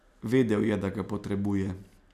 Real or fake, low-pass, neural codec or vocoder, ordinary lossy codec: real; 14.4 kHz; none; none